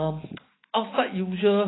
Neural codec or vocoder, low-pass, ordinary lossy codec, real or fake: none; 7.2 kHz; AAC, 16 kbps; real